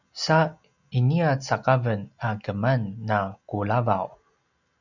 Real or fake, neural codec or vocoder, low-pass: real; none; 7.2 kHz